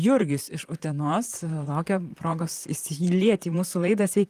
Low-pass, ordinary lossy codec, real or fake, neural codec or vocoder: 14.4 kHz; Opus, 24 kbps; fake; vocoder, 44.1 kHz, 128 mel bands, Pupu-Vocoder